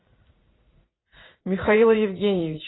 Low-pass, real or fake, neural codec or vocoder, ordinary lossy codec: 7.2 kHz; fake; vocoder, 44.1 kHz, 80 mel bands, Vocos; AAC, 16 kbps